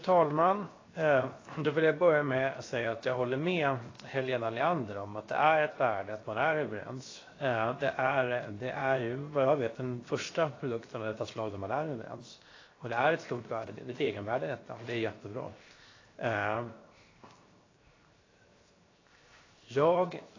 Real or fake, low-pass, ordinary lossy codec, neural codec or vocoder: fake; 7.2 kHz; AAC, 32 kbps; codec, 16 kHz, 0.7 kbps, FocalCodec